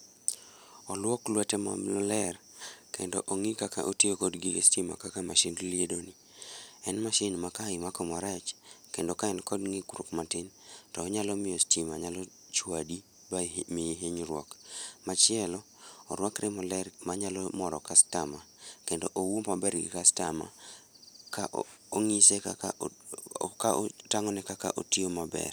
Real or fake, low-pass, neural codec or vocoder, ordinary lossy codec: real; none; none; none